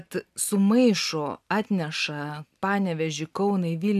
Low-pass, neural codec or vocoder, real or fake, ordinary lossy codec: 14.4 kHz; none; real; AAC, 96 kbps